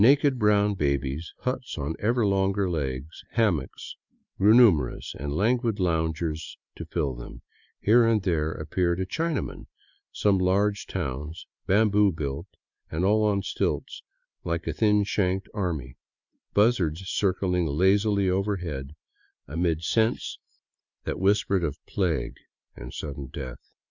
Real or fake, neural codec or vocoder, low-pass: real; none; 7.2 kHz